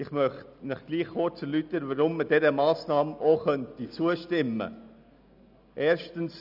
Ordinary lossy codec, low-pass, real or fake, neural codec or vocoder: none; 5.4 kHz; real; none